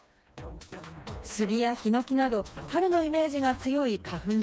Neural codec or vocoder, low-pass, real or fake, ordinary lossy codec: codec, 16 kHz, 2 kbps, FreqCodec, smaller model; none; fake; none